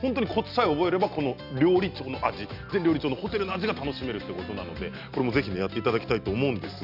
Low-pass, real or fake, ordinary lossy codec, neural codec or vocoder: 5.4 kHz; real; none; none